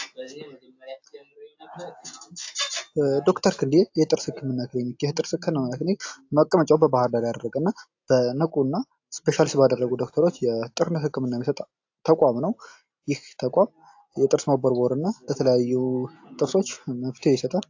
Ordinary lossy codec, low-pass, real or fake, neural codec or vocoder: AAC, 48 kbps; 7.2 kHz; real; none